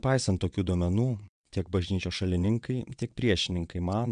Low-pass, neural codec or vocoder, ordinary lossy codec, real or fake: 9.9 kHz; vocoder, 22.05 kHz, 80 mel bands, WaveNeXt; MP3, 96 kbps; fake